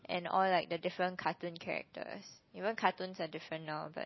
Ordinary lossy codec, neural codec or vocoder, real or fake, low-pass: MP3, 24 kbps; none; real; 7.2 kHz